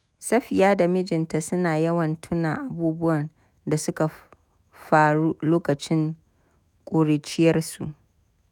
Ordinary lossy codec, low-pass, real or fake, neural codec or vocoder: none; 19.8 kHz; fake; autoencoder, 48 kHz, 128 numbers a frame, DAC-VAE, trained on Japanese speech